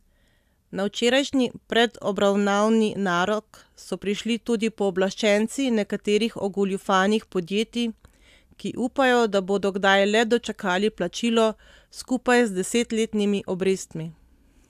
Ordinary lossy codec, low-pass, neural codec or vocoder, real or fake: AAC, 96 kbps; 14.4 kHz; none; real